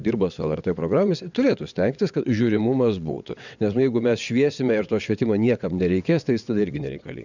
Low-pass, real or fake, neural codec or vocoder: 7.2 kHz; fake; vocoder, 22.05 kHz, 80 mel bands, WaveNeXt